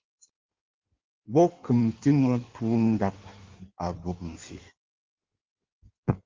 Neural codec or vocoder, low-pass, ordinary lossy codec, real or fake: codec, 16 kHz in and 24 kHz out, 1.1 kbps, FireRedTTS-2 codec; 7.2 kHz; Opus, 32 kbps; fake